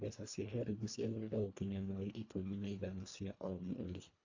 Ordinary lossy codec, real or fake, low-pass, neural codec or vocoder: AAC, 48 kbps; fake; 7.2 kHz; codec, 24 kHz, 1.5 kbps, HILCodec